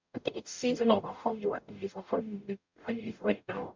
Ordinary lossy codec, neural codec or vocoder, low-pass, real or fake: MP3, 64 kbps; codec, 44.1 kHz, 0.9 kbps, DAC; 7.2 kHz; fake